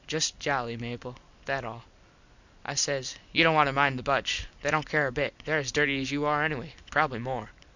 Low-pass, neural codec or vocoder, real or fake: 7.2 kHz; none; real